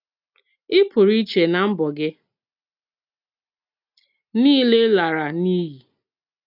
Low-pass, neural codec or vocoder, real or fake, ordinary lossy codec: 5.4 kHz; none; real; none